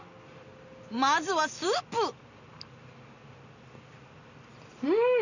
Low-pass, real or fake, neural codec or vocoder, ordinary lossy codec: 7.2 kHz; real; none; none